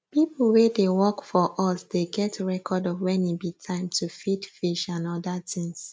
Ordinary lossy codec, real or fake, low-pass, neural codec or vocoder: none; real; none; none